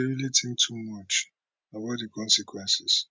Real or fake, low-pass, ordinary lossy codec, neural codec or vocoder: real; none; none; none